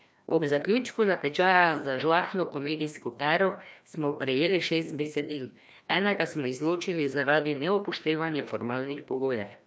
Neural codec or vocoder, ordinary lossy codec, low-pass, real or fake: codec, 16 kHz, 1 kbps, FreqCodec, larger model; none; none; fake